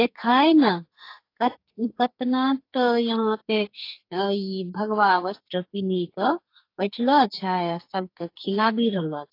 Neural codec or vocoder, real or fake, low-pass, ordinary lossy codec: codec, 44.1 kHz, 2.6 kbps, SNAC; fake; 5.4 kHz; AAC, 32 kbps